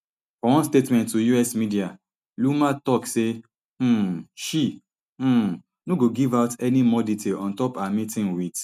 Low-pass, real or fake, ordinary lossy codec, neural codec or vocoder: 14.4 kHz; real; none; none